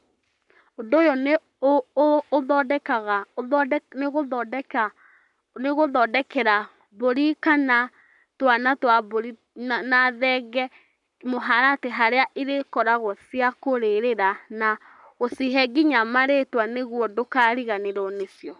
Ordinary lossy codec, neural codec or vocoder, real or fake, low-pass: none; codec, 44.1 kHz, 7.8 kbps, Pupu-Codec; fake; 10.8 kHz